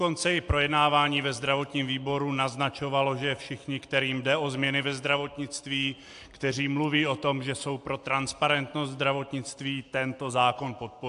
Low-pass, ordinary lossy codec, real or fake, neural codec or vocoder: 10.8 kHz; AAC, 64 kbps; real; none